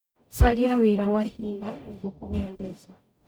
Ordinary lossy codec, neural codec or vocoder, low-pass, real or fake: none; codec, 44.1 kHz, 0.9 kbps, DAC; none; fake